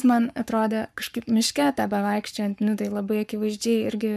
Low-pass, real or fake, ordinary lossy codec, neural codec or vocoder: 14.4 kHz; fake; MP3, 96 kbps; codec, 44.1 kHz, 7.8 kbps, Pupu-Codec